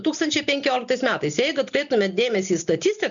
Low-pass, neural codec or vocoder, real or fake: 7.2 kHz; none; real